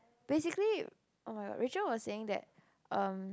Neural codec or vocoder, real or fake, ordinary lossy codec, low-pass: none; real; none; none